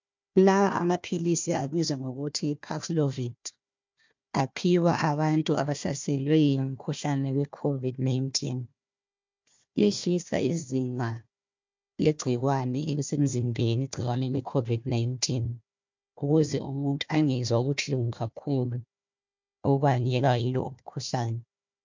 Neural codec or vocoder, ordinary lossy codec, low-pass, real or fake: codec, 16 kHz, 1 kbps, FunCodec, trained on Chinese and English, 50 frames a second; MP3, 64 kbps; 7.2 kHz; fake